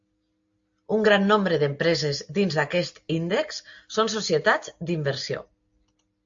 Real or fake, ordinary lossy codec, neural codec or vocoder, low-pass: real; AAC, 64 kbps; none; 7.2 kHz